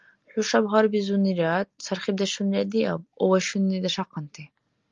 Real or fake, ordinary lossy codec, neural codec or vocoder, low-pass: real; Opus, 32 kbps; none; 7.2 kHz